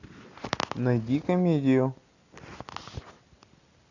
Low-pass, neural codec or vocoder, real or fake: 7.2 kHz; none; real